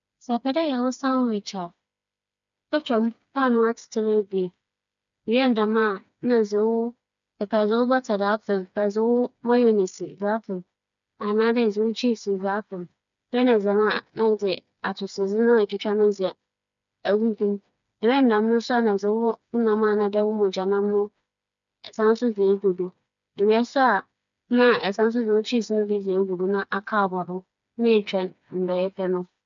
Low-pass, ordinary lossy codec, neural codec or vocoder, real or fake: 7.2 kHz; none; codec, 16 kHz, 4 kbps, FreqCodec, smaller model; fake